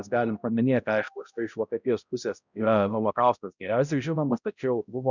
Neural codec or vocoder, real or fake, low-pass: codec, 16 kHz, 0.5 kbps, X-Codec, HuBERT features, trained on balanced general audio; fake; 7.2 kHz